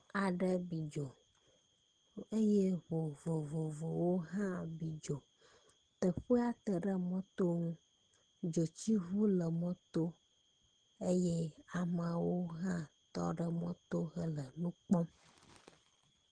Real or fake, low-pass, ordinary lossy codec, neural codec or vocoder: real; 9.9 kHz; Opus, 16 kbps; none